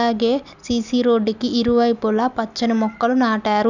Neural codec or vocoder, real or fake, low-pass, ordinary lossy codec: none; real; 7.2 kHz; none